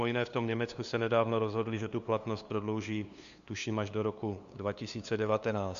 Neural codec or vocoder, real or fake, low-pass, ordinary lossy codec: codec, 16 kHz, 2 kbps, FunCodec, trained on LibriTTS, 25 frames a second; fake; 7.2 kHz; Opus, 64 kbps